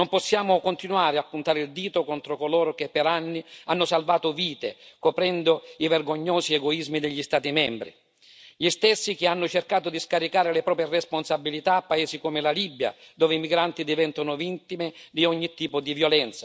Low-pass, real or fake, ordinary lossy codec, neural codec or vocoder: none; real; none; none